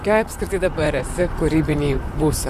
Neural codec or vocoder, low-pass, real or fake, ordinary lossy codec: vocoder, 44.1 kHz, 128 mel bands, Pupu-Vocoder; 14.4 kHz; fake; AAC, 96 kbps